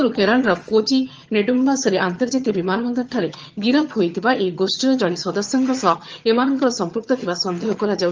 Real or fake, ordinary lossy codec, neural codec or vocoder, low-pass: fake; Opus, 24 kbps; vocoder, 22.05 kHz, 80 mel bands, HiFi-GAN; 7.2 kHz